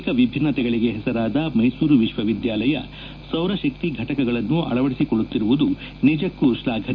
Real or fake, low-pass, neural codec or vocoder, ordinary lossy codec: real; 7.2 kHz; none; none